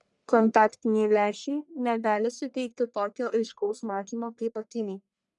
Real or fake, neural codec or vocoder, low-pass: fake; codec, 44.1 kHz, 1.7 kbps, Pupu-Codec; 10.8 kHz